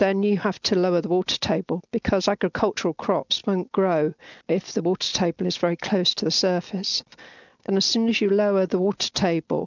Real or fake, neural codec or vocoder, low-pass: real; none; 7.2 kHz